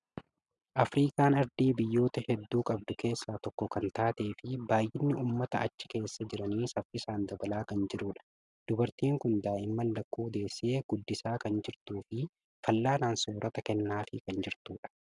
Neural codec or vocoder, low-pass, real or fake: none; 10.8 kHz; real